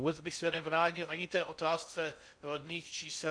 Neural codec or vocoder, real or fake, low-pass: codec, 16 kHz in and 24 kHz out, 0.6 kbps, FocalCodec, streaming, 2048 codes; fake; 9.9 kHz